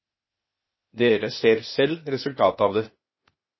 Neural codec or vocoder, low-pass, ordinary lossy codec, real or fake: codec, 16 kHz, 0.8 kbps, ZipCodec; 7.2 kHz; MP3, 24 kbps; fake